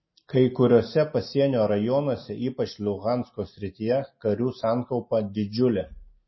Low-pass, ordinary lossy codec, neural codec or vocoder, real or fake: 7.2 kHz; MP3, 24 kbps; none; real